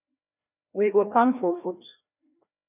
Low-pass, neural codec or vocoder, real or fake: 3.6 kHz; codec, 16 kHz, 1 kbps, FreqCodec, larger model; fake